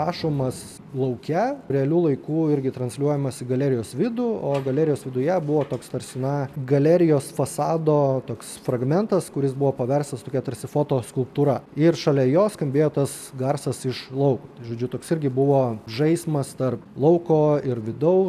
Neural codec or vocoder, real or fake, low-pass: none; real; 14.4 kHz